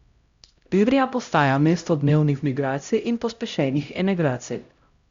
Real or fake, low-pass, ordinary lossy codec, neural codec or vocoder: fake; 7.2 kHz; Opus, 64 kbps; codec, 16 kHz, 0.5 kbps, X-Codec, HuBERT features, trained on LibriSpeech